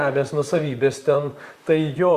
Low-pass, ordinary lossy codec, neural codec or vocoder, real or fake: 14.4 kHz; Opus, 64 kbps; vocoder, 44.1 kHz, 128 mel bands, Pupu-Vocoder; fake